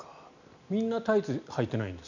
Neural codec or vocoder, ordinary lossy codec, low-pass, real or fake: none; none; 7.2 kHz; real